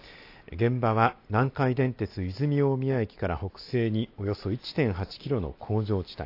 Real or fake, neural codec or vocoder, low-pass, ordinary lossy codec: real; none; 5.4 kHz; AAC, 32 kbps